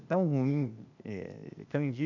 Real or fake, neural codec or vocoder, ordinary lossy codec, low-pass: fake; codec, 16 kHz, 0.8 kbps, ZipCodec; none; 7.2 kHz